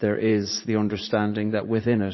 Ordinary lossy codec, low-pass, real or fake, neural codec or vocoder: MP3, 24 kbps; 7.2 kHz; fake; vocoder, 44.1 kHz, 80 mel bands, Vocos